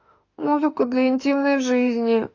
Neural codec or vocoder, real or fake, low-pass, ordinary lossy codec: autoencoder, 48 kHz, 32 numbers a frame, DAC-VAE, trained on Japanese speech; fake; 7.2 kHz; AAC, 48 kbps